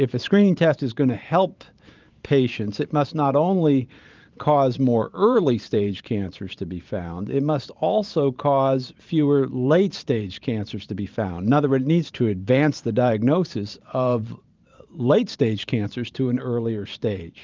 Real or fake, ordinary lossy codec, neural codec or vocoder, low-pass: fake; Opus, 24 kbps; vocoder, 44.1 kHz, 128 mel bands every 512 samples, BigVGAN v2; 7.2 kHz